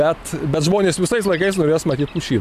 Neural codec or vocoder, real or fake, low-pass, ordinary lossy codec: none; real; 14.4 kHz; AAC, 96 kbps